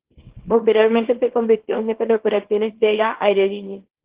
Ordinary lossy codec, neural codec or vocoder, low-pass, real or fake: Opus, 16 kbps; codec, 24 kHz, 0.9 kbps, WavTokenizer, small release; 3.6 kHz; fake